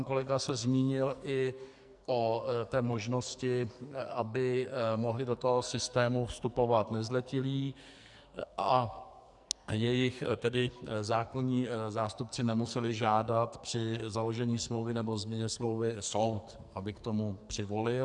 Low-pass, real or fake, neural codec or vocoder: 10.8 kHz; fake; codec, 44.1 kHz, 2.6 kbps, SNAC